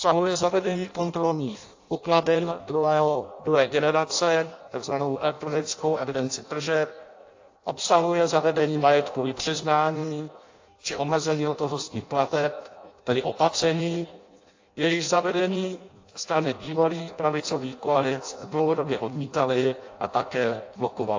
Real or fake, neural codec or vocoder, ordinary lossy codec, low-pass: fake; codec, 16 kHz in and 24 kHz out, 0.6 kbps, FireRedTTS-2 codec; AAC, 48 kbps; 7.2 kHz